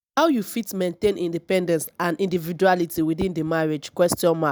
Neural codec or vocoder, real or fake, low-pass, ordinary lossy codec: none; real; none; none